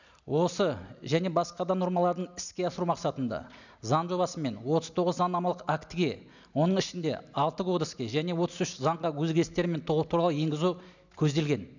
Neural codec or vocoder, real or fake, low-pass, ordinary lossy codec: none; real; 7.2 kHz; none